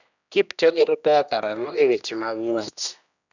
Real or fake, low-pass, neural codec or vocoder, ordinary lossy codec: fake; 7.2 kHz; codec, 16 kHz, 1 kbps, X-Codec, HuBERT features, trained on general audio; none